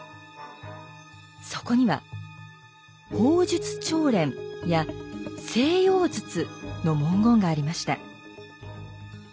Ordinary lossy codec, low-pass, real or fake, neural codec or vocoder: none; none; real; none